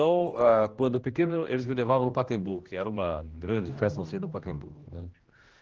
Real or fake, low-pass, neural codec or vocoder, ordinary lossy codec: fake; 7.2 kHz; codec, 16 kHz, 1 kbps, X-Codec, HuBERT features, trained on general audio; Opus, 16 kbps